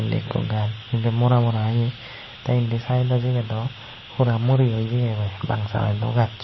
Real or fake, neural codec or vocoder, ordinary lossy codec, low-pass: real; none; MP3, 24 kbps; 7.2 kHz